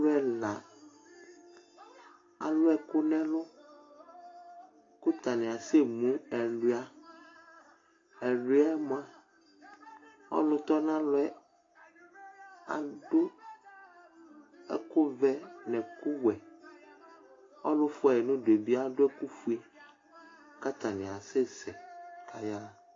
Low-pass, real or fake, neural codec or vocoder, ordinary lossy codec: 7.2 kHz; real; none; AAC, 32 kbps